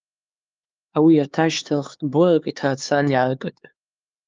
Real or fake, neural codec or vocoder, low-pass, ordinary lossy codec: fake; codec, 16 kHz, 4 kbps, X-Codec, HuBERT features, trained on LibriSpeech; 7.2 kHz; Opus, 24 kbps